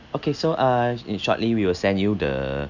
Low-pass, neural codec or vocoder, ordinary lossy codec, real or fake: 7.2 kHz; none; none; real